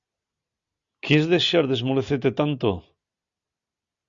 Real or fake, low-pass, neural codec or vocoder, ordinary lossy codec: real; 7.2 kHz; none; Opus, 64 kbps